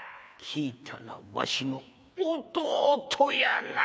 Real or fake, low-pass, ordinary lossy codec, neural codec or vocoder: fake; none; none; codec, 16 kHz, 2 kbps, FreqCodec, larger model